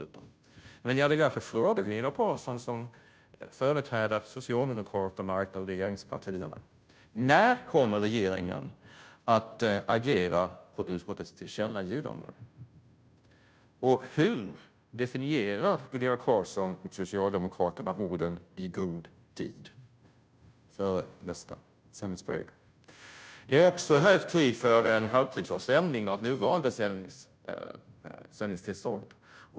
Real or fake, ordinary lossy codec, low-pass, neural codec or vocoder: fake; none; none; codec, 16 kHz, 0.5 kbps, FunCodec, trained on Chinese and English, 25 frames a second